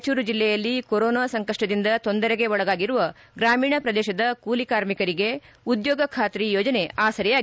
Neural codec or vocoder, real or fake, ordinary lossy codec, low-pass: none; real; none; none